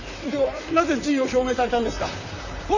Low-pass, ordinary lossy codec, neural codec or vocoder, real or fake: 7.2 kHz; none; vocoder, 44.1 kHz, 128 mel bands, Pupu-Vocoder; fake